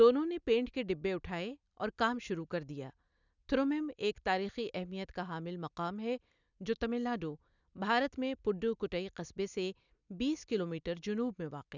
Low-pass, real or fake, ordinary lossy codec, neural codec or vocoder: 7.2 kHz; real; none; none